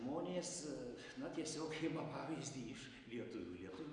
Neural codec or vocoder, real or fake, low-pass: none; real; 9.9 kHz